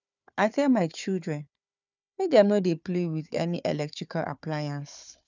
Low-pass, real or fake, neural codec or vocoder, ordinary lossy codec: 7.2 kHz; fake; codec, 16 kHz, 4 kbps, FunCodec, trained on Chinese and English, 50 frames a second; none